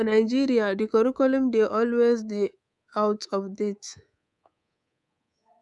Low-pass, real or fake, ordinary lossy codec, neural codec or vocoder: 10.8 kHz; fake; none; codec, 24 kHz, 3.1 kbps, DualCodec